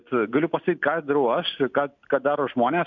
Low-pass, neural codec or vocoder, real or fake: 7.2 kHz; none; real